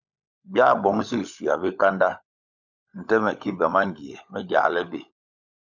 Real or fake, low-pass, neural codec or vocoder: fake; 7.2 kHz; codec, 16 kHz, 16 kbps, FunCodec, trained on LibriTTS, 50 frames a second